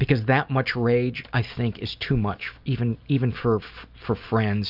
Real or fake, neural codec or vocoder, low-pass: real; none; 5.4 kHz